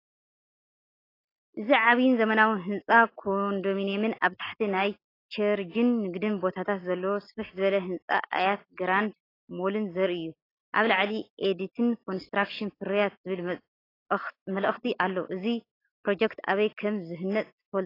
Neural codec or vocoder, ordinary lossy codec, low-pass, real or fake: none; AAC, 24 kbps; 5.4 kHz; real